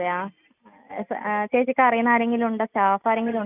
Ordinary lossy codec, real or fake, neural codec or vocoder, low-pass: none; real; none; 3.6 kHz